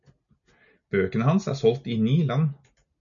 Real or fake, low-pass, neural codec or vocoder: real; 7.2 kHz; none